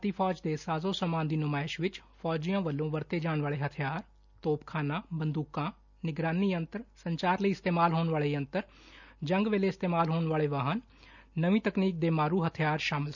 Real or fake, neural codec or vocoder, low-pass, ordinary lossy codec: real; none; 7.2 kHz; none